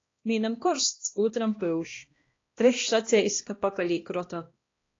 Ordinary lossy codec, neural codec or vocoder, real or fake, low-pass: AAC, 32 kbps; codec, 16 kHz, 1 kbps, X-Codec, HuBERT features, trained on balanced general audio; fake; 7.2 kHz